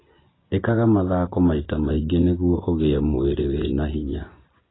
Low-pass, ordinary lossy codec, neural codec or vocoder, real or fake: 7.2 kHz; AAC, 16 kbps; vocoder, 24 kHz, 100 mel bands, Vocos; fake